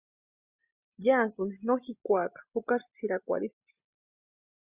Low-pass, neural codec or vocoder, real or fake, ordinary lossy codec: 3.6 kHz; none; real; Opus, 64 kbps